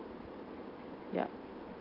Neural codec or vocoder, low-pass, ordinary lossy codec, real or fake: none; 5.4 kHz; Opus, 24 kbps; real